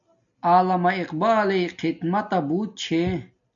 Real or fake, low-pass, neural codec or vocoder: real; 7.2 kHz; none